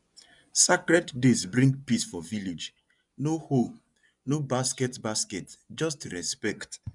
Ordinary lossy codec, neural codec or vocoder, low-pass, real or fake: none; vocoder, 24 kHz, 100 mel bands, Vocos; 10.8 kHz; fake